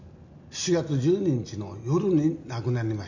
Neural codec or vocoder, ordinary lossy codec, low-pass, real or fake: none; none; 7.2 kHz; real